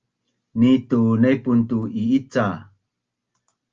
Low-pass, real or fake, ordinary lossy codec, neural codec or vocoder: 7.2 kHz; real; Opus, 32 kbps; none